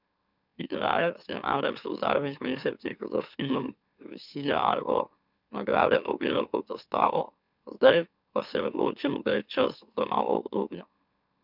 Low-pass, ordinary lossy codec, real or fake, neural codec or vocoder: 5.4 kHz; none; fake; autoencoder, 44.1 kHz, a latent of 192 numbers a frame, MeloTTS